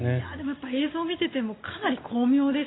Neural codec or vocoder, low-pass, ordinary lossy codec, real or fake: none; 7.2 kHz; AAC, 16 kbps; real